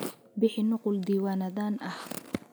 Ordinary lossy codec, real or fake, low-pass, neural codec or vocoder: none; real; none; none